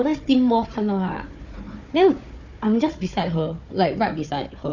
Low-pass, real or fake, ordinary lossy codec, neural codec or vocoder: 7.2 kHz; fake; none; codec, 16 kHz, 4 kbps, FunCodec, trained on Chinese and English, 50 frames a second